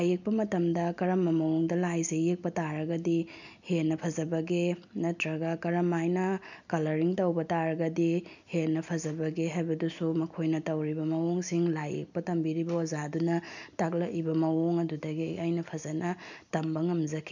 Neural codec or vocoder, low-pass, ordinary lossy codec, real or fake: none; 7.2 kHz; none; real